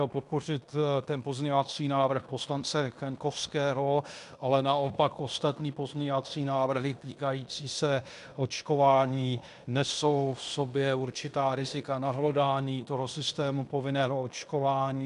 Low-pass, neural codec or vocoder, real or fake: 10.8 kHz; codec, 16 kHz in and 24 kHz out, 0.9 kbps, LongCat-Audio-Codec, fine tuned four codebook decoder; fake